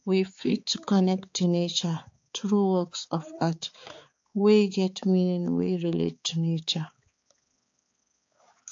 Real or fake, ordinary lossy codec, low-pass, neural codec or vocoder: fake; AAC, 48 kbps; 7.2 kHz; codec, 16 kHz, 4 kbps, X-Codec, HuBERT features, trained on balanced general audio